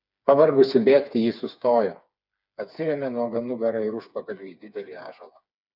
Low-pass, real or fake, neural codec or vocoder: 5.4 kHz; fake; codec, 16 kHz, 4 kbps, FreqCodec, smaller model